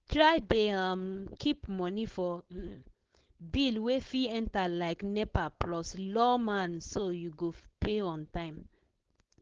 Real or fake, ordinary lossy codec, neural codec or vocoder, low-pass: fake; Opus, 16 kbps; codec, 16 kHz, 4.8 kbps, FACodec; 7.2 kHz